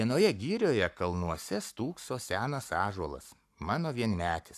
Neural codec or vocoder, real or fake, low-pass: codec, 44.1 kHz, 7.8 kbps, Pupu-Codec; fake; 14.4 kHz